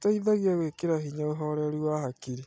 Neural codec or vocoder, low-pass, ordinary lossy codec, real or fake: none; none; none; real